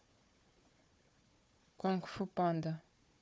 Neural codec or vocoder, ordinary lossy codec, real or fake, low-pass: codec, 16 kHz, 4 kbps, FunCodec, trained on Chinese and English, 50 frames a second; none; fake; none